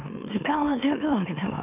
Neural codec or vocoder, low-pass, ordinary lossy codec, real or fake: autoencoder, 44.1 kHz, a latent of 192 numbers a frame, MeloTTS; 3.6 kHz; none; fake